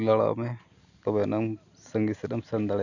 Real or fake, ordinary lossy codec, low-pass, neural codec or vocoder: real; none; 7.2 kHz; none